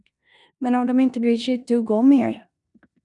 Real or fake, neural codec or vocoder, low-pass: fake; codec, 16 kHz in and 24 kHz out, 0.9 kbps, LongCat-Audio-Codec, four codebook decoder; 10.8 kHz